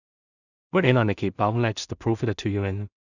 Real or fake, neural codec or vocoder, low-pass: fake; codec, 16 kHz in and 24 kHz out, 0.4 kbps, LongCat-Audio-Codec, two codebook decoder; 7.2 kHz